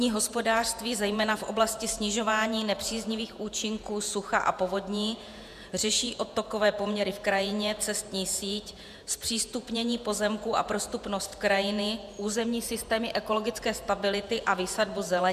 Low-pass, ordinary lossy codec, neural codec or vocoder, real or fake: 14.4 kHz; MP3, 96 kbps; vocoder, 48 kHz, 128 mel bands, Vocos; fake